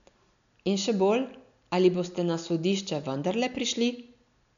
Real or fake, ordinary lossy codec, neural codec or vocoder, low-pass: real; none; none; 7.2 kHz